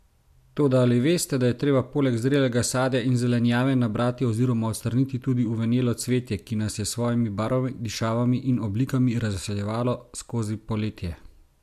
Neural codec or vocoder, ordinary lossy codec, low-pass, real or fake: none; MP3, 96 kbps; 14.4 kHz; real